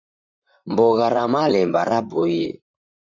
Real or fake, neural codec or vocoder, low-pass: fake; vocoder, 44.1 kHz, 128 mel bands, Pupu-Vocoder; 7.2 kHz